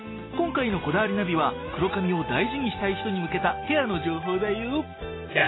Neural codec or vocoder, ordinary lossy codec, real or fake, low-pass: none; AAC, 16 kbps; real; 7.2 kHz